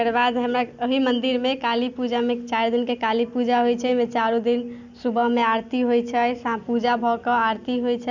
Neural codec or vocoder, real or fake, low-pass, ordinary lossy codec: none; real; 7.2 kHz; none